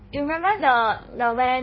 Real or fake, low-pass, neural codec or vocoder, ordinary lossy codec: fake; 7.2 kHz; codec, 16 kHz in and 24 kHz out, 1.1 kbps, FireRedTTS-2 codec; MP3, 24 kbps